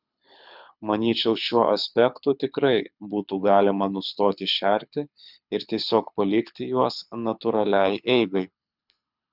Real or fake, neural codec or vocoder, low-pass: fake; codec, 24 kHz, 6 kbps, HILCodec; 5.4 kHz